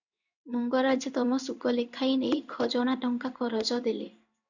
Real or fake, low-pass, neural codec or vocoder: fake; 7.2 kHz; codec, 16 kHz in and 24 kHz out, 1 kbps, XY-Tokenizer